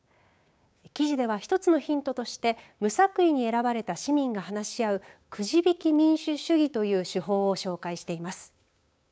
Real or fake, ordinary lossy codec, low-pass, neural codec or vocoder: fake; none; none; codec, 16 kHz, 6 kbps, DAC